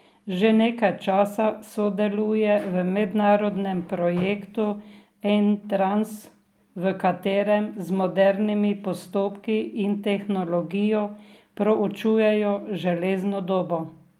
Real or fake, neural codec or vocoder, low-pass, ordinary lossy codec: real; none; 19.8 kHz; Opus, 32 kbps